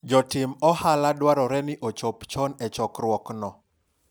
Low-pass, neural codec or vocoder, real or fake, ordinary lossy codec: none; none; real; none